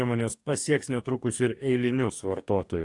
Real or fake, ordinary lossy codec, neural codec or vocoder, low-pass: fake; AAC, 64 kbps; codec, 44.1 kHz, 2.6 kbps, DAC; 10.8 kHz